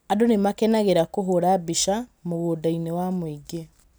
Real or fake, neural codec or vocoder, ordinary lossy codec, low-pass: real; none; none; none